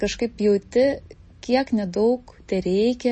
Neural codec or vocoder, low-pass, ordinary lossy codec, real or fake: none; 10.8 kHz; MP3, 32 kbps; real